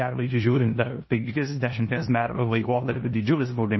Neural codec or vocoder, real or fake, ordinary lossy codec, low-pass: codec, 16 kHz in and 24 kHz out, 0.9 kbps, LongCat-Audio-Codec, four codebook decoder; fake; MP3, 24 kbps; 7.2 kHz